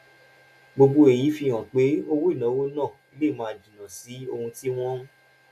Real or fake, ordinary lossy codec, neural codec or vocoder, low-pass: real; none; none; 14.4 kHz